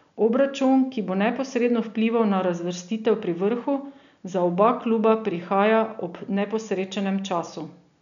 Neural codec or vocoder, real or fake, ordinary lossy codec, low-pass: none; real; none; 7.2 kHz